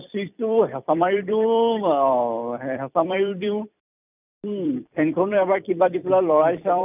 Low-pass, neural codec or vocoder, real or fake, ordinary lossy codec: 3.6 kHz; vocoder, 44.1 kHz, 128 mel bands every 256 samples, BigVGAN v2; fake; none